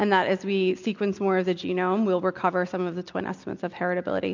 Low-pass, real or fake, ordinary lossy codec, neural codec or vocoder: 7.2 kHz; real; MP3, 64 kbps; none